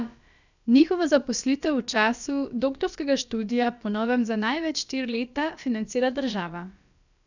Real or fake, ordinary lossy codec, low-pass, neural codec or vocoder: fake; none; 7.2 kHz; codec, 16 kHz, about 1 kbps, DyCAST, with the encoder's durations